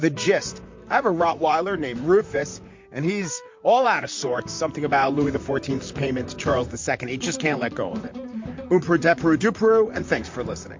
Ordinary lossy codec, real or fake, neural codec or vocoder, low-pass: MP3, 48 kbps; fake; vocoder, 44.1 kHz, 128 mel bands, Pupu-Vocoder; 7.2 kHz